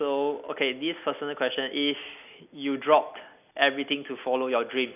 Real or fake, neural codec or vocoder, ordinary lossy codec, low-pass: real; none; none; 3.6 kHz